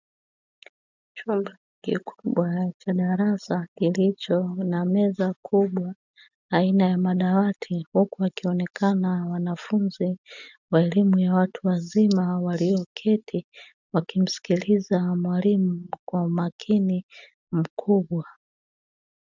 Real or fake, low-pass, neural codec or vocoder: real; 7.2 kHz; none